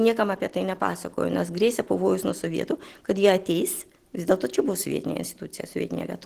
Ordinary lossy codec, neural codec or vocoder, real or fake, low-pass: Opus, 16 kbps; none; real; 14.4 kHz